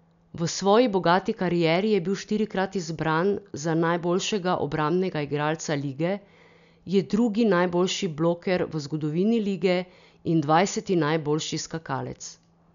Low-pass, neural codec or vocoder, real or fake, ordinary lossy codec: 7.2 kHz; none; real; none